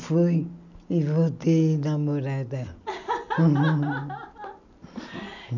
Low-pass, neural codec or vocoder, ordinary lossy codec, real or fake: 7.2 kHz; none; none; real